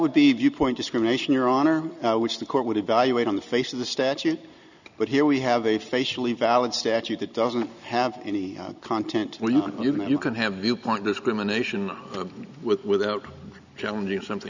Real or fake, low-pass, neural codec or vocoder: real; 7.2 kHz; none